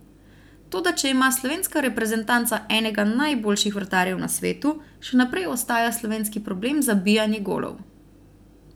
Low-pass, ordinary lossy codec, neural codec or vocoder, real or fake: none; none; none; real